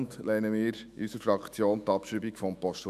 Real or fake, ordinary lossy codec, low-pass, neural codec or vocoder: fake; none; 14.4 kHz; autoencoder, 48 kHz, 128 numbers a frame, DAC-VAE, trained on Japanese speech